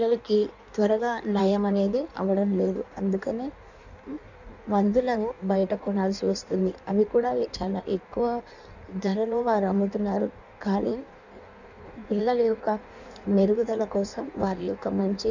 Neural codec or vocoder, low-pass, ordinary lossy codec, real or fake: codec, 16 kHz in and 24 kHz out, 1.1 kbps, FireRedTTS-2 codec; 7.2 kHz; none; fake